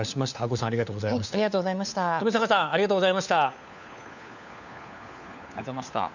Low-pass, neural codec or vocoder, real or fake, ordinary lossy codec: 7.2 kHz; codec, 16 kHz, 4 kbps, FunCodec, trained on LibriTTS, 50 frames a second; fake; none